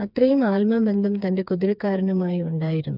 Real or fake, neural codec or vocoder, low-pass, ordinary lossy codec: fake; codec, 16 kHz, 4 kbps, FreqCodec, smaller model; 5.4 kHz; none